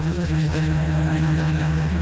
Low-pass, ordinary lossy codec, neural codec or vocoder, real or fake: none; none; codec, 16 kHz, 1 kbps, FreqCodec, smaller model; fake